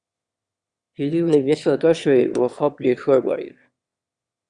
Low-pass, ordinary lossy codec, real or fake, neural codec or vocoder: 9.9 kHz; Opus, 64 kbps; fake; autoencoder, 22.05 kHz, a latent of 192 numbers a frame, VITS, trained on one speaker